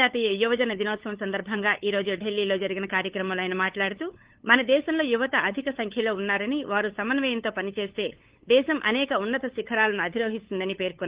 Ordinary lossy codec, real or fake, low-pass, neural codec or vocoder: Opus, 16 kbps; fake; 3.6 kHz; codec, 16 kHz, 8 kbps, FunCodec, trained on LibriTTS, 25 frames a second